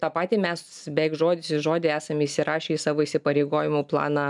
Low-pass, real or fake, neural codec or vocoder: 10.8 kHz; real; none